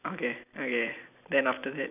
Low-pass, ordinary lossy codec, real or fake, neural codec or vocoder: 3.6 kHz; none; real; none